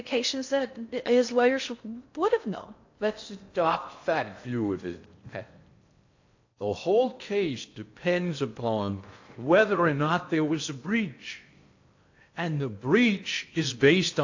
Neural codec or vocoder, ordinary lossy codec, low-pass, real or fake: codec, 16 kHz in and 24 kHz out, 0.6 kbps, FocalCodec, streaming, 4096 codes; AAC, 48 kbps; 7.2 kHz; fake